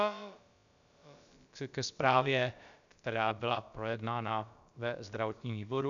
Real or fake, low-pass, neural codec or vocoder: fake; 7.2 kHz; codec, 16 kHz, about 1 kbps, DyCAST, with the encoder's durations